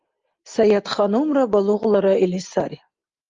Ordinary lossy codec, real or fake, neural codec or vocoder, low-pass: Opus, 32 kbps; real; none; 7.2 kHz